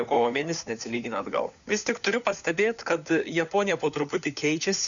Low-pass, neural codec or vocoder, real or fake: 7.2 kHz; codec, 16 kHz, 4 kbps, FunCodec, trained on LibriTTS, 50 frames a second; fake